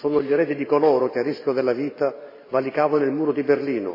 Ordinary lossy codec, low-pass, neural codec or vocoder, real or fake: MP3, 24 kbps; 5.4 kHz; vocoder, 44.1 kHz, 128 mel bands every 512 samples, BigVGAN v2; fake